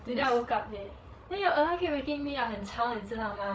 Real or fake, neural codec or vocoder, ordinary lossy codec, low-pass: fake; codec, 16 kHz, 8 kbps, FreqCodec, larger model; none; none